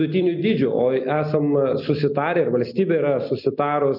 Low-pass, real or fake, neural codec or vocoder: 5.4 kHz; real; none